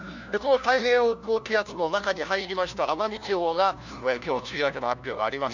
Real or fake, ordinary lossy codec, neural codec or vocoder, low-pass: fake; none; codec, 16 kHz, 1 kbps, FunCodec, trained on LibriTTS, 50 frames a second; 7.2 kHz